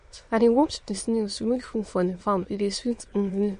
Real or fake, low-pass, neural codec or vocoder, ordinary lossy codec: fake; 9.9 kHz; autoencoder, 22.05 kHz, a latent of 192 numbers a frame, VITS, trained on many speakers; MP3, 48 kbps